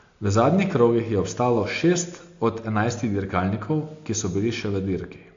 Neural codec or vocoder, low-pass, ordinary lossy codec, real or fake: none; 7.2 kHz; AAC, 48 kbps; real